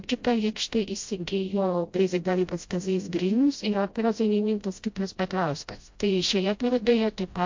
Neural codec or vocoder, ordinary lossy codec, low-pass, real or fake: codec, 16 kHz, 0.5 kbps, FreqCodec, smaller model; MP3, 48 kbps; 7.2 kHz; fake